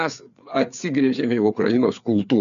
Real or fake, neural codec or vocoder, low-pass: fake; codec, 16 kHz, 4 kbps, FreqCodec, larger model; 7.2 kHz